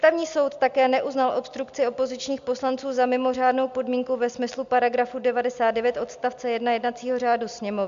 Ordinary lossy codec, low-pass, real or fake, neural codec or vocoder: MP3, 64 kbps; 7.2 kHz; real; none